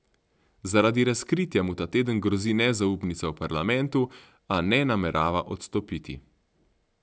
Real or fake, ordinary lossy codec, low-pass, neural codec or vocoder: real; none; none; none